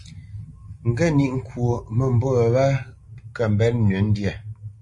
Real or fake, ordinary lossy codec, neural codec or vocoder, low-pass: real; MP3, 48 kbps; none; 10.8 kHz